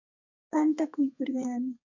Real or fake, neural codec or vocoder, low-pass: fake; codec, 32 kHz, 1.9 kbps, SNAC; 7.2 kHz